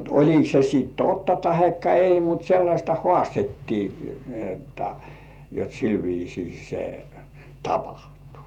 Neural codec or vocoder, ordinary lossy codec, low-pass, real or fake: vocoder, 48 kHz, 128 mel bands, Vocos; none; 19.8 kHz; fake